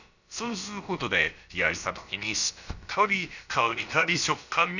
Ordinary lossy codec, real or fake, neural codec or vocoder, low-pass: none; fake; codec, 16 kHz, about 1 kbps, DyCAST, with the encoder's durations; 7.2 kHz